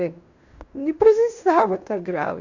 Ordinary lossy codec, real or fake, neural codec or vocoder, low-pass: none; fake; codec, 16 kHz in and 24 kHz out, 0.9 kbps, LongCat-Audio-Codec, fine tuned four codebook decoder; 7.2 kHz